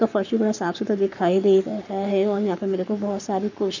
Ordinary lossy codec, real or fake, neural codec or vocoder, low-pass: none; fake; codec, 44.1 kHz, 7.8 kbps, Pupu-Codec; 7.2 kHz